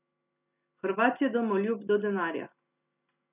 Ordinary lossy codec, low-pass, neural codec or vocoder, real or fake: none; 3.6 kHz; none; real